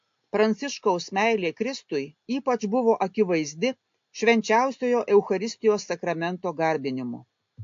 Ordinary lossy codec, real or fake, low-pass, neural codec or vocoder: AAC, 48 kbps; real; 7.2 kHz; none